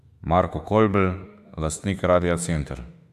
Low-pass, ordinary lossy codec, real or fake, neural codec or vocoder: 14.4 kHz; AAC, 96 kbps; fake; autoencoder, 48 kHz, 32 numbers a frame, DAC-VAE, trained on Japanese speech